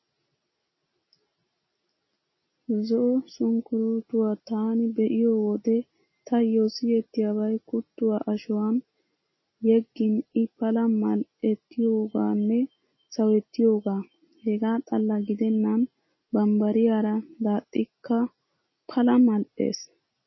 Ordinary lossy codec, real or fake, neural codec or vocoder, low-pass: MP3, 24 kbps; real; none; 7.2 kHz